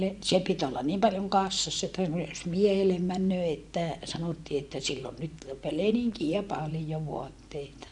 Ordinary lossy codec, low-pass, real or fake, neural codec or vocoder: none; 10.8 kHz; fake; vocoder, 24 kHz, 100 mel bands, Vocos